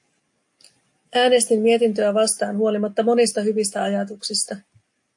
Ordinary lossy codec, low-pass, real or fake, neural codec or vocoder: AAC, 64 kbps; 10.8 kHz; real; none